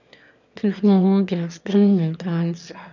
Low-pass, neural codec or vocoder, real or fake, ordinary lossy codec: 7.2 kHz; autoencoder, 22.05 kHz, a latent of 192 numbers a frame, VITS, trained on one speaker; fake; none